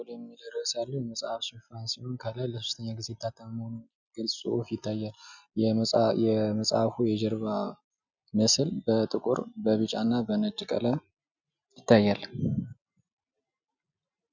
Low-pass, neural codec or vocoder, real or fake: 7.2 kHz; none; real